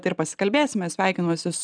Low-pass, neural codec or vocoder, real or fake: 9.9 kHz; none; real